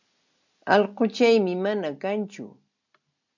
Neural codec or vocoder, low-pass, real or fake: none; 7.2 kHz; real